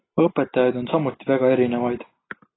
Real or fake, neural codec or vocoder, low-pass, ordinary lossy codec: real; none; 7.2 kHz; AAC, 16 kbps